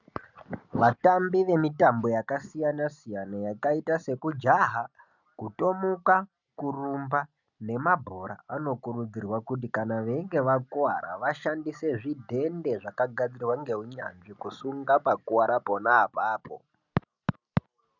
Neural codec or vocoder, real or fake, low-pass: none; real; 7.2 kHz